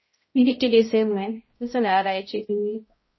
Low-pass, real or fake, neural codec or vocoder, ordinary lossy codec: 7.2 kHz; fake; codec, 16 kHz, 0.5 kbps, X-Codec, HuBERT features, trained on balanced general audio; MP3, 24 kbps